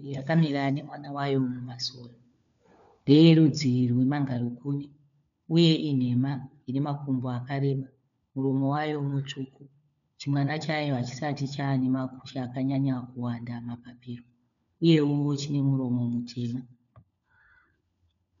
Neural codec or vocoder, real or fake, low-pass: codec, 16 kHz, 4 kbps, FunCodec, trained on LibriTTS, 50 frames a second; fake; 7.2 kHz